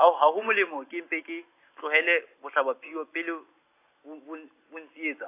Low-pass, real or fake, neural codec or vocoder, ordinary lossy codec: 3.6 kHz; real; none; AAC, 24 kbps